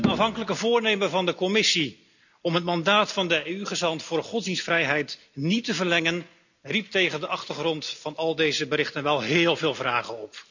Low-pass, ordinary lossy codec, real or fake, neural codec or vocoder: 7.2 kHz; none; real; none